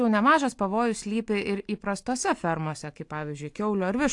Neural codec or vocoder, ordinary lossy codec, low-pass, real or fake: none; AAC, 64 kbps; 10.8 kHz; real